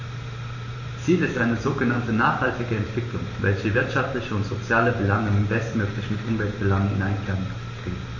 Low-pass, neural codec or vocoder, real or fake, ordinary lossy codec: 7.2 kHz; vocoder, 44.1 kHz, 128 mel bands every 512 samples, BigVGAN v2; fake; MP3, 32 kbps